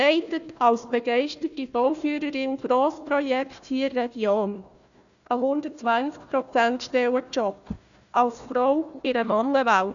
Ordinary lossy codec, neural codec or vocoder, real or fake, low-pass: none; codec, 16 kHz, 1 kbps, FunCodec, trained on Chinese and English, 50 frames a second; fake; 7.2 kHz